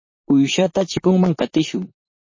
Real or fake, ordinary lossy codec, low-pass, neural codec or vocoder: fake; MP3, 32 kbps; 7.2 kHz; vocoder, 44.1 kHz, 128 mel bands, Pupu-Vocoder